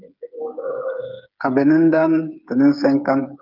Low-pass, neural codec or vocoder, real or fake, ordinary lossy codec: 5.4 kHz; codec, 16 kHz, 8 kbps, FreqCodec, smaller model; fake; Opus, 24 kbps